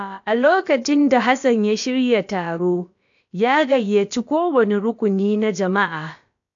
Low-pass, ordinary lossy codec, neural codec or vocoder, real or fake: 7.2 kHz; AAC, 64 kbps; codec, 16 kHz, about 1 kbps, DyCAST, with the encoder's durations; fake